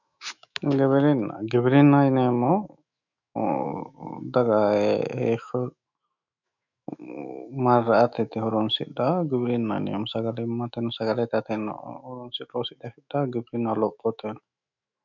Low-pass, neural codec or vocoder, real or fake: 7.2 kHz; autoencoder, 48 kHz, 128 numbers a frame, DAC-VAE, trained on Japanese speech; fake